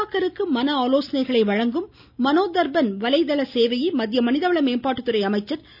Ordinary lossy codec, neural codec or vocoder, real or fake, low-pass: none; none; real; 5.4 kHz